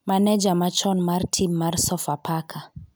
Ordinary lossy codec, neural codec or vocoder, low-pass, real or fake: none; none; none; real